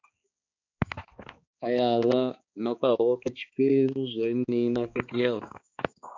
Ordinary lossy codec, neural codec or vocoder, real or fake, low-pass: MP3, 64 kbps; codec, 16 kHz, 2 kbps, X-Codec, HuBERT features, trained on balanced general audio; fake; 7.2 kHz